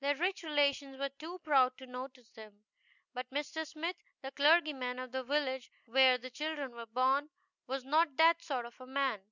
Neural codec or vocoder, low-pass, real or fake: none; 7.2 kHz; real